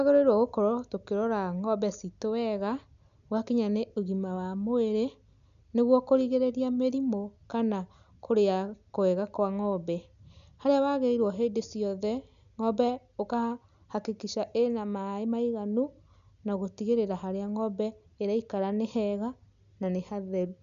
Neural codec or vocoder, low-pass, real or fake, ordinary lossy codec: none; 7.2 kHz; real; none